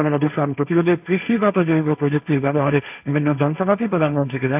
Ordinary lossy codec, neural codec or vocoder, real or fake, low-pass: AAC, 32 kbps; codec, 16 kHz, 1.1 kbps, Voila-Tokenizer; fake; 3.6 kHz